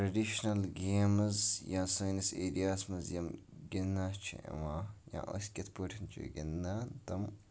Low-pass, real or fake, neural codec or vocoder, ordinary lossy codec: none; real; none; none